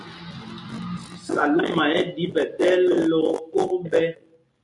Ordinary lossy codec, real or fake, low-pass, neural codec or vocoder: MP3, 64 kbps; real; 10.8 kHz; none